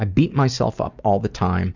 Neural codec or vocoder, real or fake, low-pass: none; real; 7.2 kHz